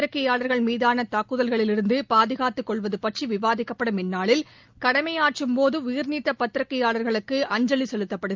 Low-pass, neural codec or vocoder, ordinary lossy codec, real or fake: 7.2 kHz; none; Opus, 32 kbps; real